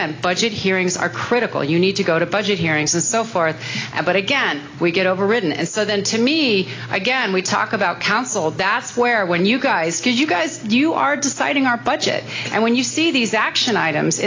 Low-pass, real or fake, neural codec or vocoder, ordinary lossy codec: 7.2 kHz; real; none; AAC, 32 kbps